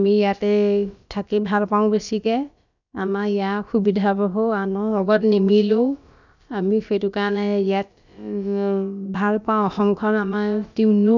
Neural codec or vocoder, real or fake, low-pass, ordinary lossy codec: codec, 16 kHz, about 1 kbps, DyCAST, with the encoder's durations; fake; 7.2 kHz; none